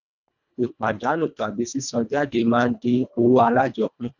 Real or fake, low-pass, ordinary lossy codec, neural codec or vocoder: fake; 7.2 kHz; none; codec, 24 kHz, 1.5 kbps, HILCodec